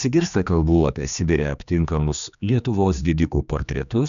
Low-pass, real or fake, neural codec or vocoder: 7.2 kHz; fake; codec, 16 kHz, 2 kbps, X-Codec, HuBERT features, trained on general audio